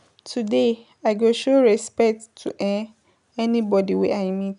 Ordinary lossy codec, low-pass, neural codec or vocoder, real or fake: none; 10.8 kHz; none; real